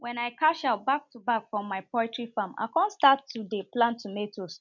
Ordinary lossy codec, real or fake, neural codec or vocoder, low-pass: none; real; none; 7.2 kHz